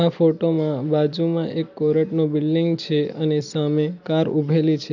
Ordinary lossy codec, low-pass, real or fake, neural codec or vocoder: none; 7.2 kHz; real; none